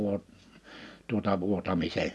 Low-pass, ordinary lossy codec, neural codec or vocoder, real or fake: none; none; none; real